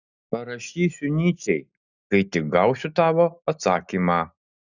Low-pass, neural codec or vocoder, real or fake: 7.2 kHz; none; real